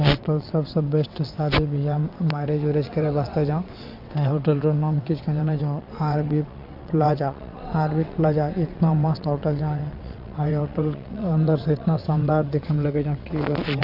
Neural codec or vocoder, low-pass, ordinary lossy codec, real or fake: vocoder, 22.05 kHz, 80 mel bands, WaveNeXt; 5.4 kHz; none; fake